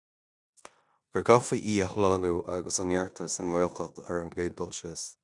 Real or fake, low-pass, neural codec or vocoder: fake; 10.8 kHz; codec, 16 kHz in and 24 kHz out, 0.9 kbps, LongCat-Audio-Codec, four codebook decoder